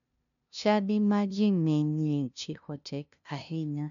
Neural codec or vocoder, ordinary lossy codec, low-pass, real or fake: codec, 16 kHz, 0.5 kbps, FunCodec, trained on LibriTTS, 25 frames a second; none; 7.2 kHz; fake